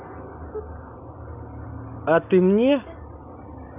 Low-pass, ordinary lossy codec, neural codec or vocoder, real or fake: 3.6 kHz; none; none; real